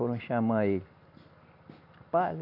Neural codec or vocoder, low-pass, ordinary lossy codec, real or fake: none; 5.4 kHz; none; real